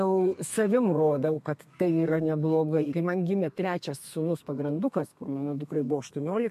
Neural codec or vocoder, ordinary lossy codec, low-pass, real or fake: codec, 32 kHz, 1.9 kbps, SNAC; MP3, 64 kbps; 14.4 kHz; fake